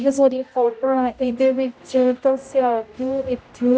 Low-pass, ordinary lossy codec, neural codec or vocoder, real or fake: none; none; codec, 16 kHz, 0.5 kbps, X-Codec, HuBERT features, trained on general audio; fake